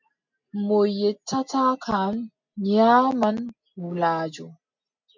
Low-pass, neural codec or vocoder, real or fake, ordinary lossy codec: 7.2 kHz; none; real; MP3, 48 kbps